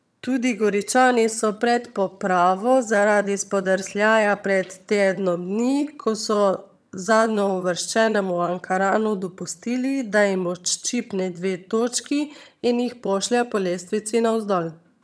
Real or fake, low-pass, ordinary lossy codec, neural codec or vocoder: fake; none; none; vocoder, 22.05 kHz, 80 mel bands, HiFi-GAN